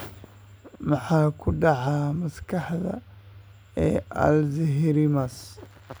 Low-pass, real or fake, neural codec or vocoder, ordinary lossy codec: none; real; none; none